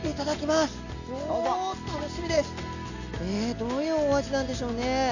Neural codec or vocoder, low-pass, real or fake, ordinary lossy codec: none; 7.2 kHz; real; none